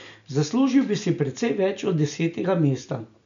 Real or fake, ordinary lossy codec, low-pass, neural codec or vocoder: real; none; 7.2 kHz; none